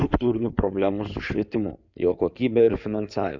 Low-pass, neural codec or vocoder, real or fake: 7.2 kHz; codec, 16 kHz in and 24 kHz out, 2.2 kbps, FireRedTTS-2 codec; fake